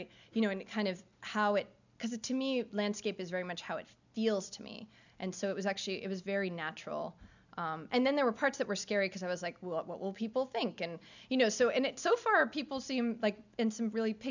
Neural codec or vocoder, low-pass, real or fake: none; 7.2 kHz; real